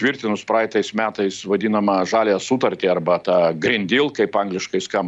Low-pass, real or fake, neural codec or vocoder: 10.8 kHz; real; none